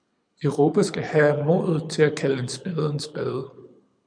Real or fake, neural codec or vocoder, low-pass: fake; codec, 24 kHz, 6 kbps, HILCodec; 9.9 kHz